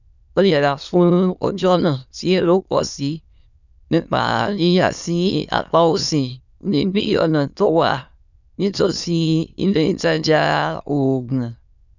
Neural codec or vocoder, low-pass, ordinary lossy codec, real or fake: autoencoder, 22.05 kHz, a latent of 192 numbers a frame, VITS, trained on many speakers; 7.2 kHz; none; fake